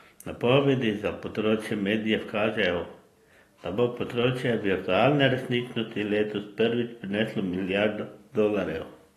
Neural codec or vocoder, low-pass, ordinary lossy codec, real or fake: none; 14.4 kHz; AAC, 48 kbps; real